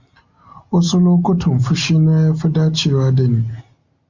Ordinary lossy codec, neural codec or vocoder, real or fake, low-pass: Opus, 64 kbps; none; real; 7.2 kHz